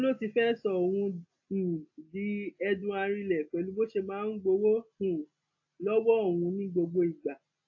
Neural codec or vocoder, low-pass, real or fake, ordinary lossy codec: none; 7.2 kHz; real; AAC, 48 kbps